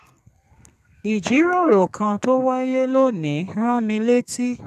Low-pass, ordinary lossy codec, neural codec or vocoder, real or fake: 14.4 kHz; none; codec, 32 kHz, 1.9 kbps, SNAC; fake